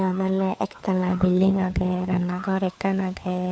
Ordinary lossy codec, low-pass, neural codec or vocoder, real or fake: none; none; codec, 16 kHz, 4 kbps, FunCodec, trained on LibriTTS, 50 frames a second; fake